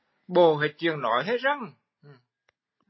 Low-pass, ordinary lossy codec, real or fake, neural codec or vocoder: 7.2 kHz; MP3, 24 kbps; real; none